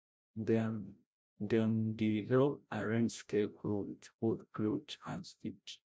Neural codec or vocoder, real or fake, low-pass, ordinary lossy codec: codec, 16 kHz, 0.5 kbps, FreqCodec, larger model; fake; none; none